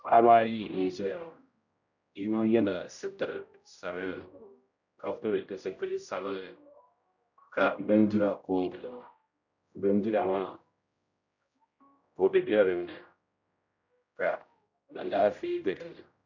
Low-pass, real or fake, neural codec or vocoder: 7.2 kHz; fake; codec, 16 kHz, 0.5 kbps, X-Codec, HuBERT features, trained on general audio